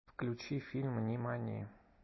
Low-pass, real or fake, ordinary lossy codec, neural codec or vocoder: 7.2 kHz; real; MP3, 24 kbps; none